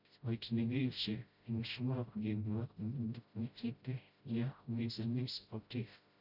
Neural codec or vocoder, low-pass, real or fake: codec, 16 kHz, 0.5 kbps, FreqCodec, smaller model; 5.4 kHz; fake